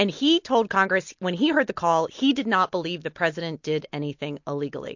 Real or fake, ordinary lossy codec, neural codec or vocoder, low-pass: real; MP3, 48 kbps; none; 7.2 kHz